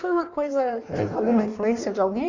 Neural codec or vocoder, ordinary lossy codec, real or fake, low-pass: codec, 16 kHz in and 24 kHz out, 1.1 kbps, FireRedTTS-2 codec; none; fake; 7.2 kHz